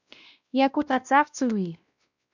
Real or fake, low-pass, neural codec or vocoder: fake; 7.2 kHz; codec, 16 kHz, 1 kbps, X-Codec, WavLM features, trained on Multilingual LibriSpeech